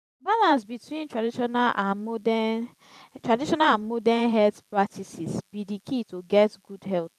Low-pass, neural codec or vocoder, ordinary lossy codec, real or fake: 14.4 kHz; vocoder, 44.1 kHz, 128 mel bands every 512 samples, BigVGAN v2; none; fake